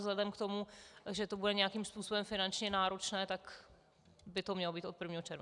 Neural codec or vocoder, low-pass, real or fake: none; 10.8 kHz; real